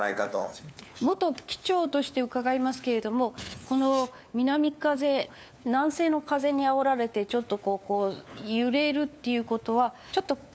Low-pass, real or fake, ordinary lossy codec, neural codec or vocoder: none; fake; none; codec, 16 kHz, 4 kbps, FunCodec, trained on LibriTTS, 50 frames a second